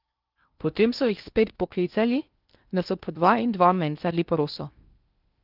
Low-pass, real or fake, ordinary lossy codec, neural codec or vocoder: 5.4 kHz; fake; Opus, 24 kbps; codec, 16 kHz in and 24 kHz out, 0.6 kbps, FocalCodec, streaming, 2048 codes